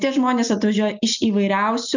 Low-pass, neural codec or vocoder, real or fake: 7.2 kHz; none; real